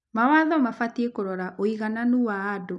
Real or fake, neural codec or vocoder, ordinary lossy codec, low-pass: real; none; none; 10.8 kHz